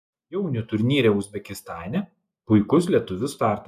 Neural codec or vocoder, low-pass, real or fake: none; 14.4 kHz; real